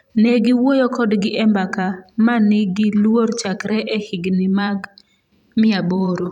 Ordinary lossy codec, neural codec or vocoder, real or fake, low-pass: none; vocoder, 44.1 kHz, 128 mel bands every 512 samples, BigVGAN v2; fake; 19.8 kHz